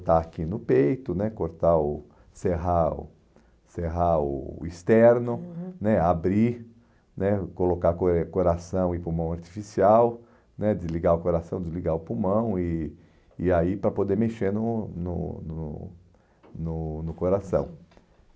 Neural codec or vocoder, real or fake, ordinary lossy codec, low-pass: none; real; none; none